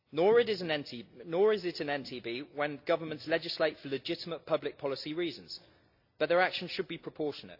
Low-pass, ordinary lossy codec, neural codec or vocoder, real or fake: 5.4 kHz; AAC, 48 kbps; none; real